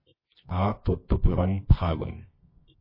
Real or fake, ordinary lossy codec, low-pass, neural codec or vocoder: fake; MP3, 24 kbps; 5.4 kHz; codec, 24 kHz, 0.9 kbps, WavTokenizer, medium music audio release